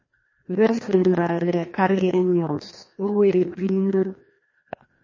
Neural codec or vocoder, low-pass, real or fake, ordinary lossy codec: codec, 16 kHz, 1 kbps, FreqCodec, larger model; 7.2 kHz; fake; MP3, 32 kbps